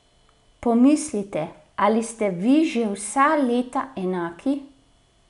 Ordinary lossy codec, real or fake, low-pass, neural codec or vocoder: none; real; 10.8 kHz; none